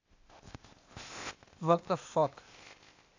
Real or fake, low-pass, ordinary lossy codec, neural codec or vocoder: fake; 7.2 kHz; AAC, 48 kbps; codec, 16 kHz, 0.8 kbps, ZipCodec